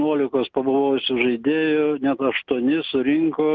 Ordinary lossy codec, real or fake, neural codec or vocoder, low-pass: Opus, 24 kbps; real; none; 7.2 kHz